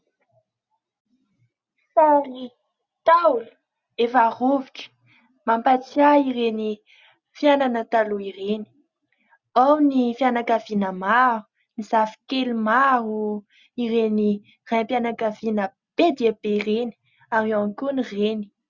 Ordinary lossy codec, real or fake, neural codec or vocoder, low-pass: Opus, 64 kbps; real; none; 7.2 kHz